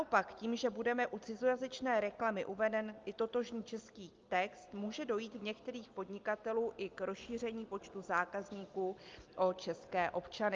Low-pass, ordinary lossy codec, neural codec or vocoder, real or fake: 7.2 kHz; Opus, 32 kbps; none; real